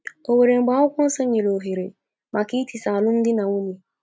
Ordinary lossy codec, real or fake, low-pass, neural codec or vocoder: none; real; none; none